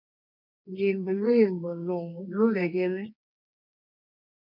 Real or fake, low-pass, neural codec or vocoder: fake; 5.4 kHz; codec, 24 kHz, 0.9 kbps, WavTokenizer, medium music audio release